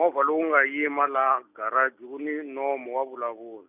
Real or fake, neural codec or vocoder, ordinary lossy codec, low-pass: real; none; none; 3.6 kHz